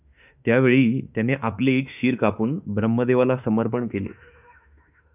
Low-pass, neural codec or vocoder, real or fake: 3.6 kHz; autoencoder, 48 kHz, 32 numbers a frame, DAC-VAE, trained on Japanese speech; fake